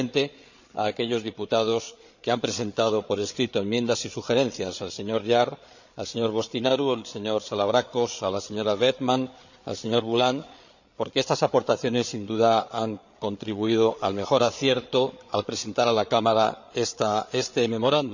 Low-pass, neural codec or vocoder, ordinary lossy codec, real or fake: 7.2 kHz; codec, 16 kHz, 8 kbps, FreqCodec, larger model; none; fake